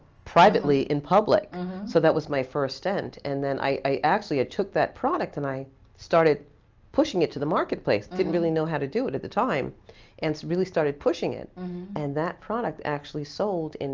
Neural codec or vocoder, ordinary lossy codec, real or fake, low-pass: none; Opus, 24 kbps; real; 7.2 kHz